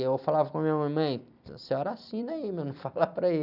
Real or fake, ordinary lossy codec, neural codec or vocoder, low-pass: fake; none; vocoder, 44.1 kHz, 128 mel bands every 256 samples, BigVGAN v2; 5.4 kHz